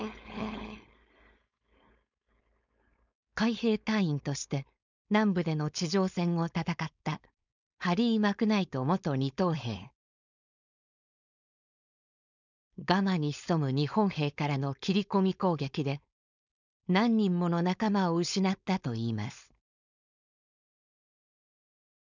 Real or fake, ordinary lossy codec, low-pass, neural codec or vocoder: fake; none; 7.2 kHz; codec, 16 kHz, 4.8 kbps, FACodec